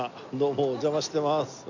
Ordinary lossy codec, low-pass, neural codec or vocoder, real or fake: none; 7.2 kHz; none; real